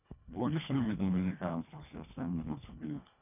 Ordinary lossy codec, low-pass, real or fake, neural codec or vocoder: none; 3.6 kHz; fake; codec, 24 kHz, 1.5 kbps, HILCodec